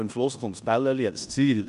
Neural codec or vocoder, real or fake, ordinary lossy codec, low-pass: codec, 16 kHz in and 24 kHz out, 0.9 kbps, LongCat-Audio-Codec, four codebook decoder; fake; none; 10.8 kHz